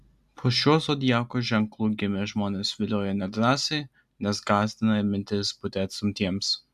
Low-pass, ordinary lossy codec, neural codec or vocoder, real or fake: 14.4 kHz; AAC, 96 kbps; none; real